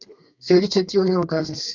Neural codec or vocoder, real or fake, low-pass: codec, 32 kHz, 1.9 kbps, SNAC; fake; 7.2 kHz